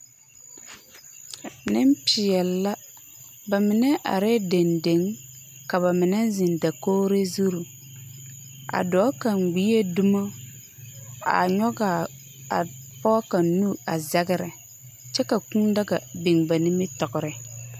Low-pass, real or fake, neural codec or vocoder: 14.4 kHz; real; none